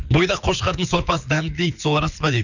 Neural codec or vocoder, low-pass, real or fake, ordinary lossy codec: codec, 24 kHz, 6 kbps, HILCodec; 7.2 kHz; fake; none